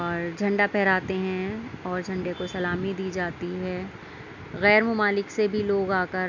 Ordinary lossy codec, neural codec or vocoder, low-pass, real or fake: none; none; 7.2 kHz; real